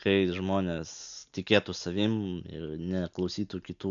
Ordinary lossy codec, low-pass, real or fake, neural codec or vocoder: MP3, 96 kbps; 7.2 kHz; real; none